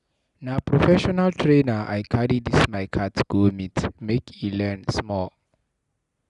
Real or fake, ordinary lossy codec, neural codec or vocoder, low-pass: real; none; none; 10.8 kHz